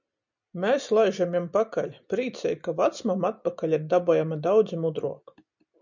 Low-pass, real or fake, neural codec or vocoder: 7.2 kHz; real; none